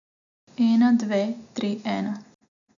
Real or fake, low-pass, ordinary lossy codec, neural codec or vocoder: real; 7.2 kHz; none; none